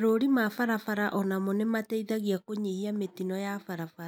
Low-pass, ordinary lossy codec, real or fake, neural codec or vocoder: none; none; real; none